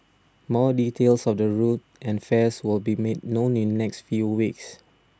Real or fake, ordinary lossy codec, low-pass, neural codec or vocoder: real; none; none; none